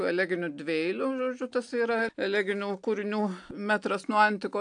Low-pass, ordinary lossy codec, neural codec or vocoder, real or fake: 9.9 kHz; AAC, 64 kbps; none; real